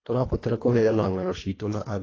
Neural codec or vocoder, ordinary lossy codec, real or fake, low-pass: codec, 24 kHz, 1.5 kbps, HILCodec; AAC, 32 kbps; fake; 7.2 kHz